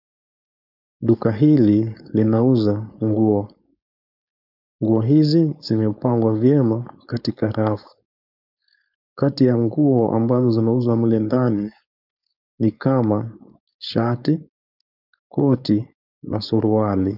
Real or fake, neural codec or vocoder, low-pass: fake; codec, 16 kHz, 4.8 kbps, FACodec; 5.4 kHz